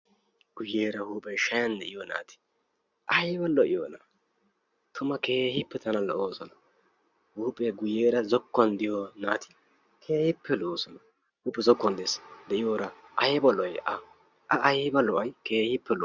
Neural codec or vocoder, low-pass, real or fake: none; 7.2 kHz; real